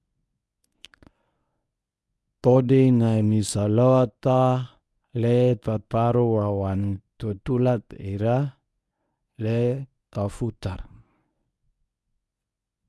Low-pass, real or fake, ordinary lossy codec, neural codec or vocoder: none; fake; none; codec, 24 kHz, 0.9 kbps, WavTokenizer, medium speech release version 1